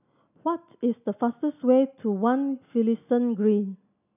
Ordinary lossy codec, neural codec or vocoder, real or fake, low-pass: none; none; real; 3.6 kHz